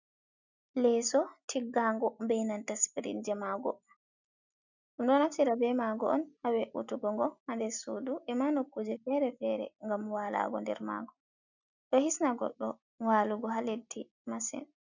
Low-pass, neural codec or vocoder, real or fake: 7.2 kHz; none; real